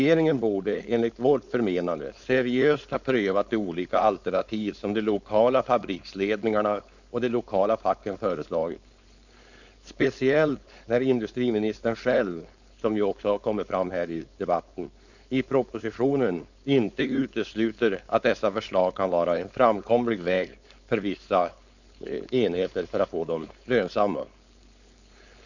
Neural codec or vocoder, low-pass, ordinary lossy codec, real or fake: codec, 16 kHz, 4.8 kbps, FACodec; 7.2 kHz; none; fake